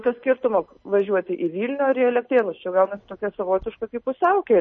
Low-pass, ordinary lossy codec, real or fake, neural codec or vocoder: 7.2 kHz; MP3, 32 kbps; real; none